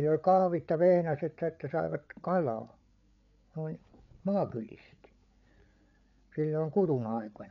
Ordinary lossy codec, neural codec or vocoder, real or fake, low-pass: none; codec, 16 kHz, 8 kbps, FreqCodec, larger model; fake; 7.2 kHz